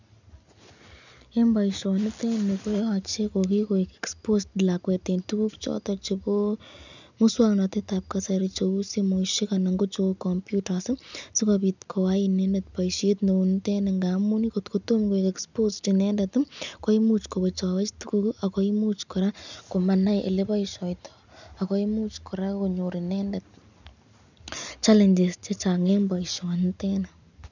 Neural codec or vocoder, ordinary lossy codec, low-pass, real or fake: none; none; 7.2 kHz; real